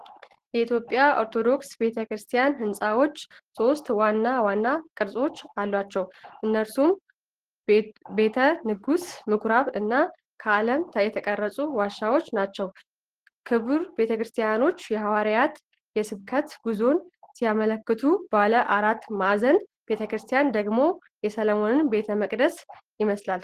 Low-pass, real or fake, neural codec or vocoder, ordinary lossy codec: 14.4 kHz; real; none; Opus, 16 kbps